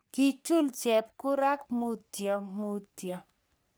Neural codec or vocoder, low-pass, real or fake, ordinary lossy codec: codec, 44.1 kHz, 3.4 kbps, Pupu-Codec; none; fake; none